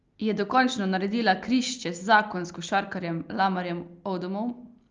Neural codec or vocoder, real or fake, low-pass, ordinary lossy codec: none; real; 7.2 kHz; Opus, 32 kbps